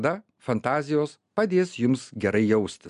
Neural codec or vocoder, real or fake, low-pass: none; real; 10.8 kHz